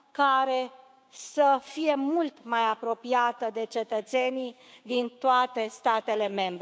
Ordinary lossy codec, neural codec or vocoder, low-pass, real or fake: none; codec, 16 kHz, 6 kbps, DAC; none; fake